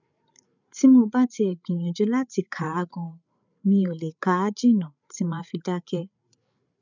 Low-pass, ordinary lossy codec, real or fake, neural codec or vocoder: 7.2 kHz; none; fake; codec, 16 kHz, 8 kbps, FreqCodec, larger model